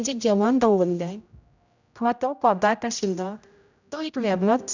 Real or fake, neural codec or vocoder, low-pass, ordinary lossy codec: fake; codec, 16 kHz, 0.5 kbps, X-Codec, HuBERT features, trained on general audio; 7.2 kHz; none